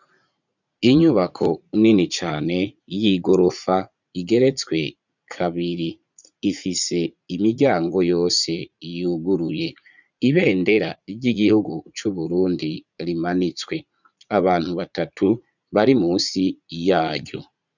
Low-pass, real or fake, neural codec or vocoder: 7.2 kHz; fake; vocoder, 44.1 kHz, 80 mel bands, Vocos